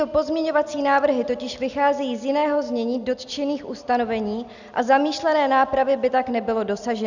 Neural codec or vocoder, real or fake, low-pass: none; real; 7.2 kHz